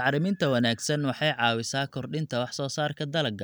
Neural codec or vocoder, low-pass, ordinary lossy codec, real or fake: none; none; none; real